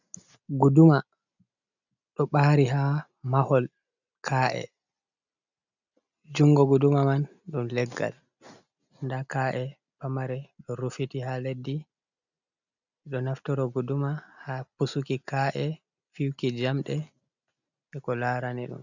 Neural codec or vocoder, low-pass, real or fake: none; 7.2 kHz; real